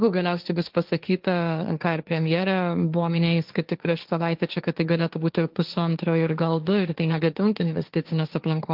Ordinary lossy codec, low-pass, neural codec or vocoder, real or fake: Opus, 32 kbps; 5.4 kHz; codec, 16 kHz, 1.1 kbps, Voila-Tokenizer; fake